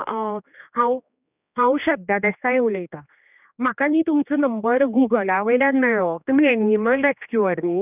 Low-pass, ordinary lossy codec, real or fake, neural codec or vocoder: 3.6 kHz; none; fake; codec, 16 kHz, 2 kbps, X-Codec, HuBERT features, trained on general audio